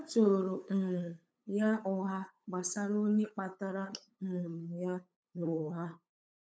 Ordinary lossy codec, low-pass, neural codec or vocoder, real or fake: none; none; codec, 16 kHz, 8 kbps, FunCodec, trained on LibriTTS, 25 frames a second; fake